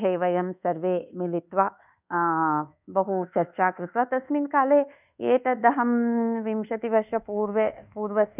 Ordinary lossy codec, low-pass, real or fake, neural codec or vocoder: none; 3.6 kHz; fake; codec, 24 kHz, 1.2 kbps, DualCodec